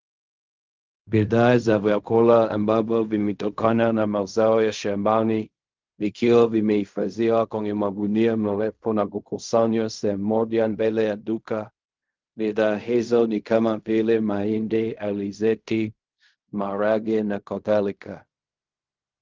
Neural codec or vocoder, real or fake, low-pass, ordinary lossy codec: codec, 16 kHz in and 24 kHz out, 0.4 kbps, LongCat-Audio-Codec, fine tuned four codebook decoder; fake; 7.2 kHz; Opus, 24 kbps